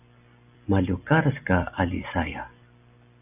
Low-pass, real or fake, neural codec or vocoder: 3.6 kHz; real; none